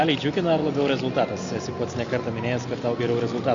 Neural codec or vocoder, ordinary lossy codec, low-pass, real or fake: none; Opus, 64 kbps; 7.2 kHz; real